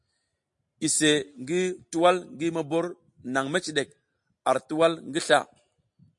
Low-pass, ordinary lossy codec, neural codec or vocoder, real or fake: 10.8 kHz; MP3, 48 kbps; none; real